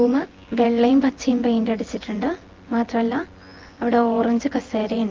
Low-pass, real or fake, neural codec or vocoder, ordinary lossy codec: 7.2 kHz; fake; vocoder, 24 kHz, 100 mel bands, Vocos; Opus, 16 kbps